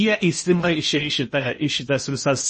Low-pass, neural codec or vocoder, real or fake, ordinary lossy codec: 10.8 kHz; codec, 16 kHz in and 24 kHz out, 0.8 kbps, FocalCodec, streaming, 65536 codes; fake; MP3, 32 kbps